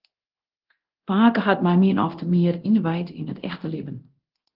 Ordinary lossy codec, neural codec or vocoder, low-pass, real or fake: Opus, 16 kbps; codec, 24 kHz, 0.9 kbps, DualCodec; 5.4 kHz; fake